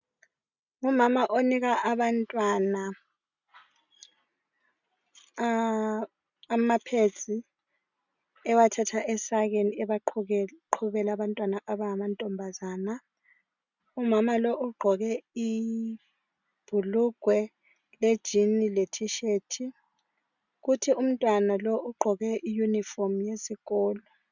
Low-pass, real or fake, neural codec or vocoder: 7.2 kHz; real; none